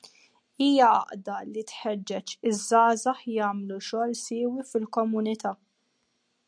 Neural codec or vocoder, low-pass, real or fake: none; 9.9 kHz; real